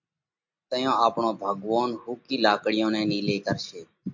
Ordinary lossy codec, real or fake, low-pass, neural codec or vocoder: MP3, 48 kbps; real; 7.2 kHz; none